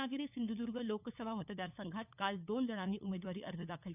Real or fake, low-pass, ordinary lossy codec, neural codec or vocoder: fake; 3.6 kHz; none; codec, 16 kHz, 8 kbps, FunCodec, trained on LibriTTS, 25 frames a second